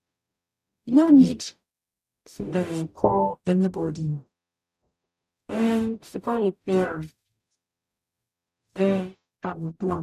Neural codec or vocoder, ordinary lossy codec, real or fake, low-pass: codec, 44.1 kHz, 0.9 kbps, DAC; none; fake; 14.4 kHz